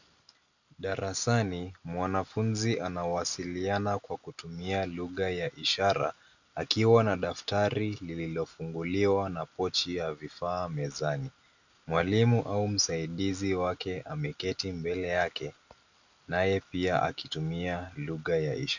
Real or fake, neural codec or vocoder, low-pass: real; none; 7.2 kHz